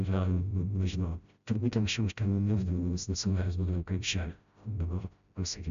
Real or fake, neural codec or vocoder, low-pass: fake; codec, 16 kHz, 0.5 kbps, FreqCodec, smaller model; 7.2 kHz